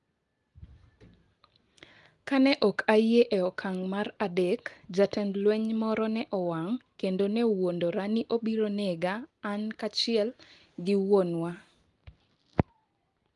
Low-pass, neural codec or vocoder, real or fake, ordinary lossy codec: 10.8 kHz; none; real; Opus, 32 kbps